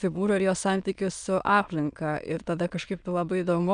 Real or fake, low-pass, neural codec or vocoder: fake; 9.9 kHz; autoencoder, 22.05 kHz, a latent of 192 numbers a frame, VITS, trained on many speakers